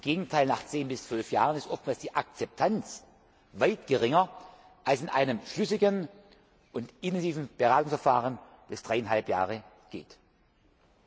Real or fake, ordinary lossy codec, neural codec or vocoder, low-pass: real; none; none; none